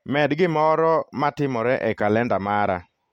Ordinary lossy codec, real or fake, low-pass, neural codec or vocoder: MP3, 64 kbps; real; 19.8 kHz; none